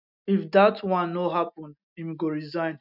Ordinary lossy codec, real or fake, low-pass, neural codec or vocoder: none; real; 5.4 kHz; none